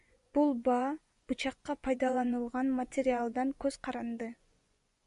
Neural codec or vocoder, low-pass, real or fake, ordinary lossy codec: vocoder, 24 kHz, 100 mel bands, Vocos; 10.8 kHz; fake; MP3, 96 kbps